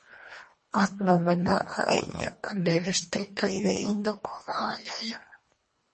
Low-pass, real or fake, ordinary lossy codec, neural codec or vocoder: 10.8 kHz; fake; MP3, 32 kbps; codec, 24 kHz, 1.5 kbps, HILCodec